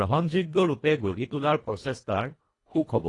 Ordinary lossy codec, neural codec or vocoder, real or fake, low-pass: AAC, 32 kbps; codec, 24 kHz, 1.5 kbps, HILCodec; fake; 10.8 kHz